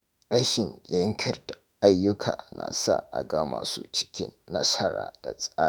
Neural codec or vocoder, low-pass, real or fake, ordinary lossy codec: autoencoder, 48 kHz, 32 numbers a frame, DAC-VAE, trained on Japanese speech; none; fake; none